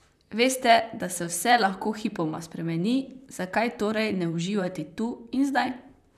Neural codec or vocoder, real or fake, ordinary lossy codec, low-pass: vocoder, 44.1 kHz, 128 mel bands, Pupu-Vocoder; fake; none; 14.4 kHz